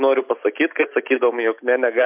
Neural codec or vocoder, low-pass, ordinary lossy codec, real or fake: none; 3.6 kHz; MP3, 32 kbps; real